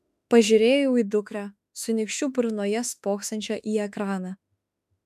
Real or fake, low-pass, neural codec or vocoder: fake; 14.4 kHz; autoencoder, 48 kHz, 32 numbers a frame, DAC-VAE, trained on Japanese speech